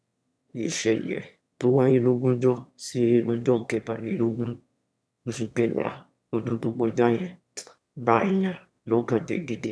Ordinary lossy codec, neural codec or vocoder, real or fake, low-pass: none; autoencoder, 22.05 kHz, a latent of 192 numbers a frame, VITS, trained on one speaker; fake; none